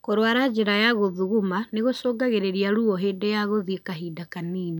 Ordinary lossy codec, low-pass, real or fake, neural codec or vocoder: none; 19.8 kHz; real; none